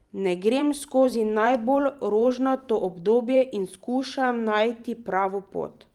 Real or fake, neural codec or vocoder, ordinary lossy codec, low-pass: fake; vocoder, 44.1 kHz, 128 mel bands every 512 samples, BigVGAN v2; Opus, 32 kbps; 19.8 kHz